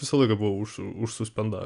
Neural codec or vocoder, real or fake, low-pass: none; real; 10.8 kHz